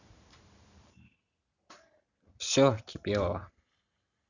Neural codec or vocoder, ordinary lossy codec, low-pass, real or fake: none; none; 7.2 kHz; real